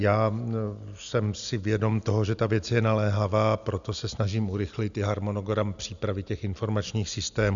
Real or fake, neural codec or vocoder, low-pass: real; none; 7.2 kHz